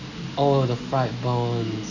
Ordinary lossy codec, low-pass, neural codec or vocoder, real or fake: none; 7.2 kHz; none; real